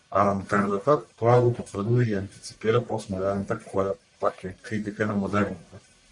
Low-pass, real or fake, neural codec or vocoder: 10.8 kHz; fake; codec, 44.1 kHz, 1.7 kbps, Pupu-Codec